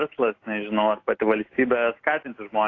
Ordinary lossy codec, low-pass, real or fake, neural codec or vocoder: AAC, 32 kbps; 7.2 kHz; real; none